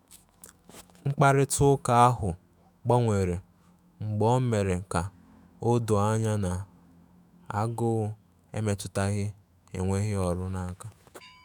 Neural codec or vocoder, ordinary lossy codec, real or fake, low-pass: autoencoder, 48 kHz, 128 numbers a frame, DAC-VAE, trained on Japanese speech; none; fake; none